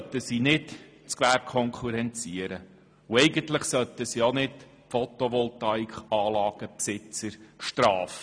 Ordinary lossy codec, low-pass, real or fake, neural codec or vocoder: none; 9.9 kHz; real; none